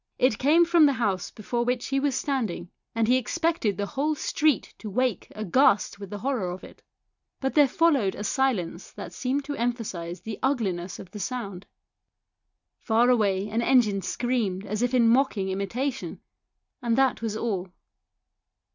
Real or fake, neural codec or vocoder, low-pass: real; none; 7.2 kHz